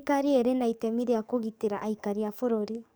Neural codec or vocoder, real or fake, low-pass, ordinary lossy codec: codec, 44.1 kHz, 7.8 kbps, DAC; fake; none; none